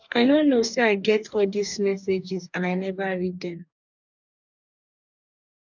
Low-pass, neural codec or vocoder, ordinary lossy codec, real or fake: 7.2 kHz; codec, 44.1 kHz, 2.6 kbps, DAC; none; fake